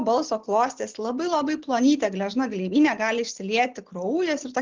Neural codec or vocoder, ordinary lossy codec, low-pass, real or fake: none; Opus, 16 kbps; 7.2 kHz; real